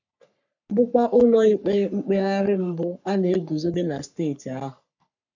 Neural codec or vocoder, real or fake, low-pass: codec, 44.1 kHz, 3.4 kbps, Pupu-Codec; fake; 7.2 kHz